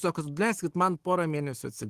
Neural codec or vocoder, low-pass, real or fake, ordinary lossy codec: autoencoder, 48 kHz, 128 numbers a frame, DAC-VAE, trained on Japanese speech; 14.4 kHz; fake; Opus, 16 kbps